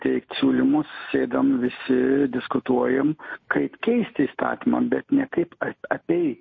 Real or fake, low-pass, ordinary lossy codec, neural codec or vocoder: real; 7.2 kHz; MP3, 32 kbps; none